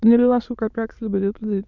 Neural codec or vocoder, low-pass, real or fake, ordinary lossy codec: autoencoder, 22.05 kHz, a latent of 192 numbers a frame, VITS, trained on many speakers; 7.2 kHz; fake; none